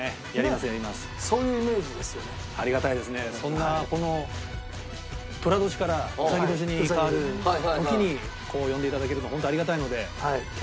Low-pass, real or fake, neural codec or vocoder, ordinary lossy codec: none; real; none; none